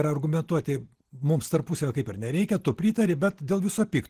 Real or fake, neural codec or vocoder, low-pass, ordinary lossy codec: real; none; 14.4 kHz; Opus, 16 kbps